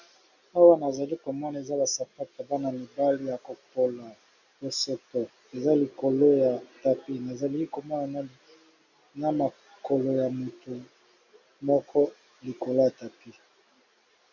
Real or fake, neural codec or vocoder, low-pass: real; none; 7.2 kHz